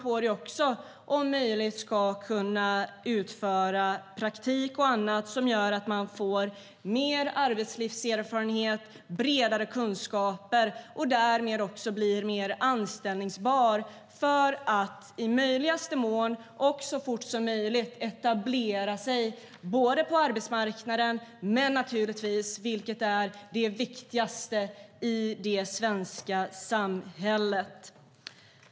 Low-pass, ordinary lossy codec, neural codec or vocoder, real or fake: none; none; none; real